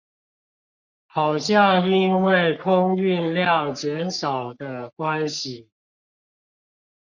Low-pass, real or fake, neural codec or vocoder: 7.2 kHz; fake; codec, 44.1 kHz, 3.4 kbps, Pupu-Codec